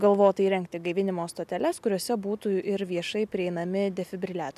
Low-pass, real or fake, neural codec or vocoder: 14.4 kHz; real; none